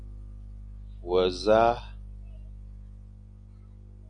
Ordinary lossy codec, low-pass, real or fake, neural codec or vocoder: AAC, 64 kbps; 9.9 kHz; real; none